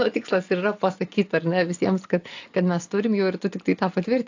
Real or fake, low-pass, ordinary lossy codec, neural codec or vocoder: real; 7.2 kHz; AAC, 48 kbps; none